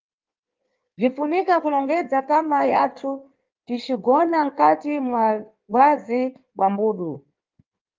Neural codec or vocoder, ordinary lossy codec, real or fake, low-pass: codec, 16 kHz in and 24 kHz out, 1.1 kbps, FireRedTTS-2 codec; Opus, 24 kbps; fake; 7.2 kHz